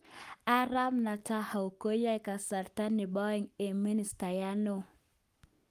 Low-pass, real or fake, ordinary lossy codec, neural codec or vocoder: 14.4 kHz; fake; Opus, 32 kbps; codec, 44.1 kHz, 7.8 kbps, Pupu-Codec